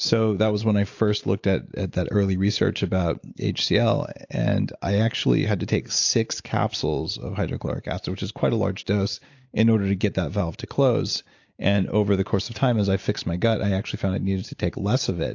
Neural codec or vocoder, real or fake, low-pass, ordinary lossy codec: none; real; 7.2 kHz; AAC, 48 kbps